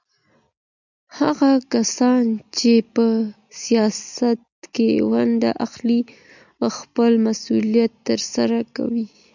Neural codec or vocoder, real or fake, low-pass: none; real; 7.2 kHz